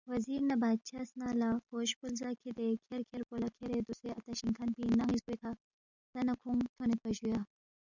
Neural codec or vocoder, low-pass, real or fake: none; 7.2 kHz; real